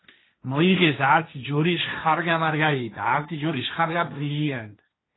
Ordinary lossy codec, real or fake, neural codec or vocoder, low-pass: AAC, 16 kbps; fake; codec, 16 kHz, 1.1 kbps, Voila-Tokenizer; 7.2 kHz